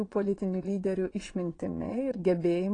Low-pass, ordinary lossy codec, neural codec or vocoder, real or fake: 9.9 kHz; AAC, 32 kbps; vocoder, 22.05 kHz, 80 mel bands, Vocos; fake